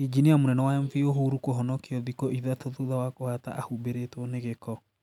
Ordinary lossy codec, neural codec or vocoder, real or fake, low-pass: none; none; real; 19.8 kHz